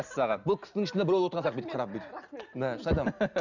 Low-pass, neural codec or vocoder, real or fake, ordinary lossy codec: 7.2 kHz; none; real; none